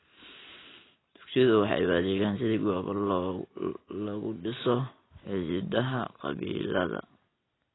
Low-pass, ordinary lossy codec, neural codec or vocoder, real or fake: 7.2 kHz; AAC, 16 kbps; none; real